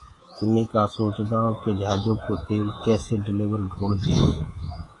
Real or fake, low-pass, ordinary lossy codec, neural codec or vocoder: fake; 10.8 kHz; AAC, 32 kbps; codec, 24 kHz, 3.1 kbps, DualCodec